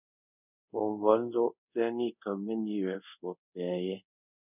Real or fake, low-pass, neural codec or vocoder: fake; 3.6 kHz; codec, 24 kHz, 0.5 kbps, DualCodec